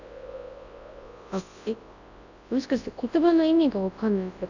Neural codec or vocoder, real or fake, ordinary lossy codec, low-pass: codec, 24 kHz, 0.9 kbps, WavTokenizer, large speech release; fake; none; 7.2 kHz